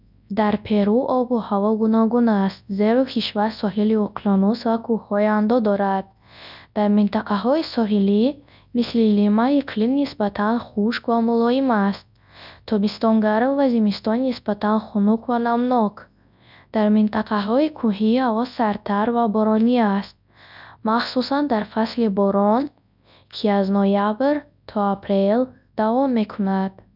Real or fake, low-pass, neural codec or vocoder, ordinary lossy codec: fake; 5.4 kHz; codec, 24 kHz, 0.9 kbps, WavTokenizer, large speech release; none